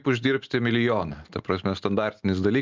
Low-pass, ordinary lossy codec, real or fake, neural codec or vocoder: 7.2 kHz; Opus, 32 kbps; real; none